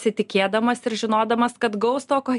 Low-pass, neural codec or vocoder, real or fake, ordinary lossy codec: 10.8 kHz; none; real; MP3, 96 kbps